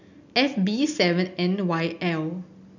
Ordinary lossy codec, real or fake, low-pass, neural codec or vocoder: none; real; 7.2 kHz; none